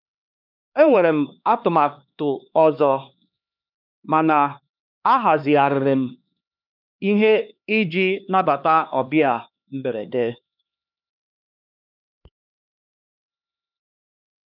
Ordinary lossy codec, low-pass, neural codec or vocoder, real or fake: none; 5.4 kHz; codec, 16 kHz, 2 kbps, X-Codec, HuBERT features, trained on LibriSpeech; fake